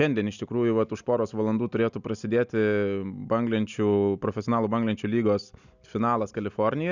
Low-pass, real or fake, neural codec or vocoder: 7.2 kHz; real; none